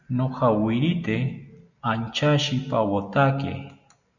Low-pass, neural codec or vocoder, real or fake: 7.2 kHz; none; real